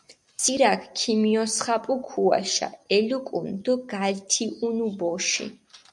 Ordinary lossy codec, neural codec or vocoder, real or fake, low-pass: MP3, 96 kbps; none; real; 10.8 kHz